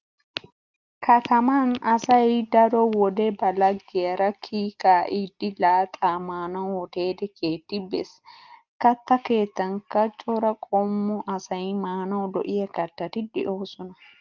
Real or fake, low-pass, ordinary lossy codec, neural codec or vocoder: real; 7.2 kHz; Opus, 32 kbps; none